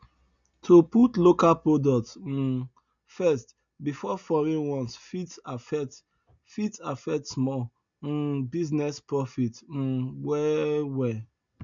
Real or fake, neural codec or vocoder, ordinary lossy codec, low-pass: real; none; none; 7.2 kHz